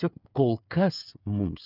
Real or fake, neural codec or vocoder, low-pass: fake; codec, 16 kHz, 8 kbps, FreqCodec, smaller model; 5.4 kHz